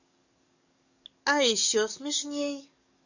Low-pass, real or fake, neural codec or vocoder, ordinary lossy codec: 7.2 kHz; fake; codec, 44.1 kHz, 7.8 kbps, DAC; none